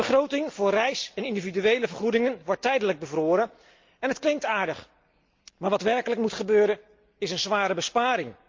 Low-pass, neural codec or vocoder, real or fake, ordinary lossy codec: 7.2 kHz; none; real; Opus, 24 kbps